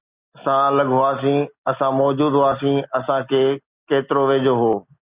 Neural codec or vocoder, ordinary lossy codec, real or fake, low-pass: none; Opus, 64 kbps; real; 3.6 kHz